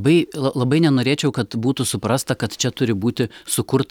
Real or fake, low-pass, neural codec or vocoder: real; 19.8 kHz; none